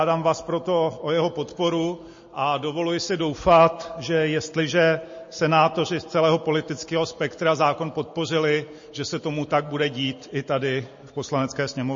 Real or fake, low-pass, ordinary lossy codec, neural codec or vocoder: real; 7.2 kHz; MP3, 32 kbps; none